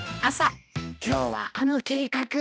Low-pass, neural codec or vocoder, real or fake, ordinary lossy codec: none; codec, 16 kHz, 1 kbps, X-Codec, HuBERT features, trained on balanced general audio; fake; none